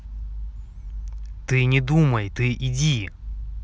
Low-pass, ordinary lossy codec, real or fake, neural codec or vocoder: none; none; real; none